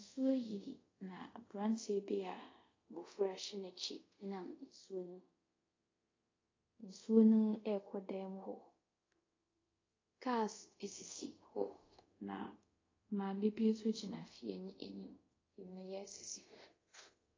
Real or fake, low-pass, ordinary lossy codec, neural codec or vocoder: fake; 7.2 kHz; AAC, 32 kbps; codec, 24 kHz, 0.5 kbps, DualCodec